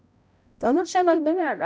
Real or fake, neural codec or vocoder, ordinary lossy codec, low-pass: fake; codec, 16 kHz, 0.5 kbps, X-Codec, HuBERT features, trained on balanced general audio; none; none